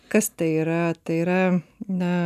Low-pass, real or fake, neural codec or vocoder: 14.4 kHz; real; none